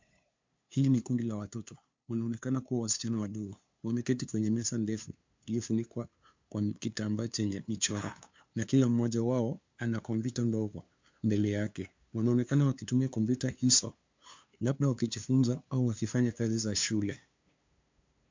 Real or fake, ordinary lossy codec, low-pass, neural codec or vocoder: fake; MP3, 64 kbps; 7.2 kHz; codec, 16 kHz, 2 kbps, FunCodec, trained on LibriTTS, 25 frames a second